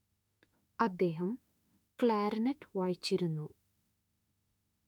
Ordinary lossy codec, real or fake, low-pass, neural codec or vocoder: none; fake; 19.8 kHz; autoencoder, 48 kHz, 32 numbers a frame, DAC-VAE, trained on Japanese speech